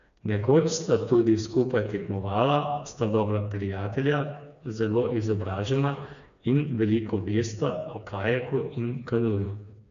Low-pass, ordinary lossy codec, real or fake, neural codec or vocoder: 7.2 kHz; none; fake; codec, 16 kHz, 2 kbps, FreqCodec, smaller model